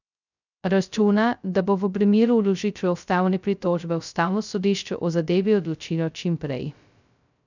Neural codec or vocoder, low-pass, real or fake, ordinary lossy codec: codec, 16 kHz, 0.2 kbps, FocalCodec; 7.2 kHz; fake; none